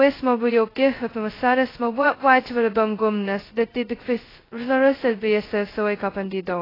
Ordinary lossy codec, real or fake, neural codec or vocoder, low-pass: AAC, 24 kbps; fake; codec, 16 kHz, 0.2 kbps, FocalCodec; 5.4 kHz